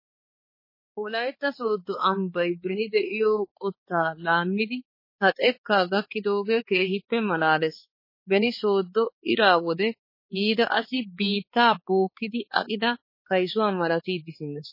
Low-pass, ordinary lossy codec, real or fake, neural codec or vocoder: 5.4 kHz; MP3, 24 kbps; fake; codec, 16 kHz, 4 kbps, X-Codec, HuBERT features, trained on balanced general audio